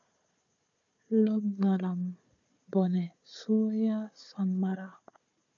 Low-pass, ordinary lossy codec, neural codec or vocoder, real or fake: 7.2 kHz; AAC, 64 kbps; codec, 16 kHz, 4 kbps, FunCodec, trained on Chinese and English, 50 frames a second; fake